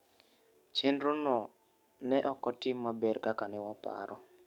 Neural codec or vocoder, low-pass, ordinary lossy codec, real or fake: codec, 44.1 kHz, 7.8 kbps, DAC; 19.8 kHz; none; fake